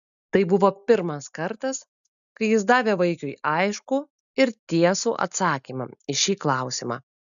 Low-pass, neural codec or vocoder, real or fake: 7.2 kHz; none; real